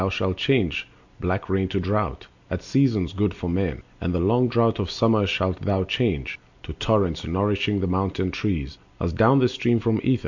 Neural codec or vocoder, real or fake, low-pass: none; real; 7.2 kHz